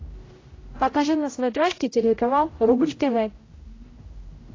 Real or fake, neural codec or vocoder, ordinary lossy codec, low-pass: fake; codec, 16 kHz, 0.5 kbps, X-Codec, HuBERT features, trained on general audio; AAC, 32 kbps; 7.2 kHz